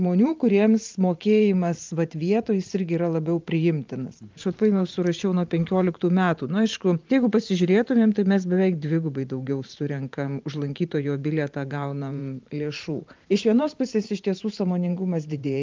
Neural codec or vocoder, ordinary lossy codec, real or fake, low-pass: vocoder, 44.1 kHz, 128 mel bands every 512 samples, BigVGAN v2; Opus, 24 kbps; fake; 7.2 kHz